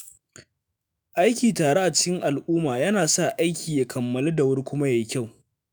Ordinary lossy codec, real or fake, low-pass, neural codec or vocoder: none; fake; none; autoencoder, 48 kHz, 128 numbers a frame, DAC-VAE, trained on Japanese speech